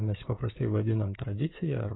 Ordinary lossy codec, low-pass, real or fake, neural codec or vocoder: AAC, 16 kbps; 7.2 kHz; fake; codec, 16 kHz, 16 kbps, FreqCodec, smaller model